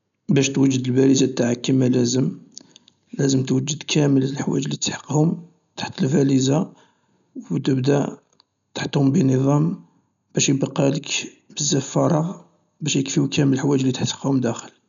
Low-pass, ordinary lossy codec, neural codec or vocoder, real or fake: 7.2 kHz; none; none; real